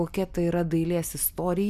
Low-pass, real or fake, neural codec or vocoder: 14.4 kHz; real; none